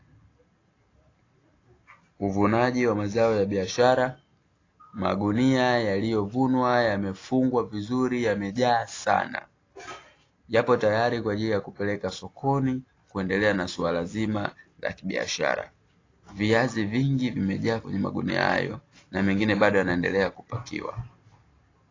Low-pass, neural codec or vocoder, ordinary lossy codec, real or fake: 7.2 kHz; none; AAC, 32 kbps; real